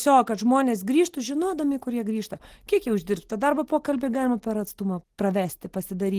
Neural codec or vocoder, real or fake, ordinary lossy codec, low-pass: none; real; Opus, 16 kbps; 14.4 kHz